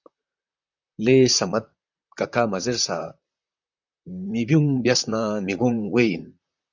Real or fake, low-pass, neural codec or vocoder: fake; 7.2 kHz; vocoder, 44.1 kHz, 128 mel bands, Pupu-Vocoder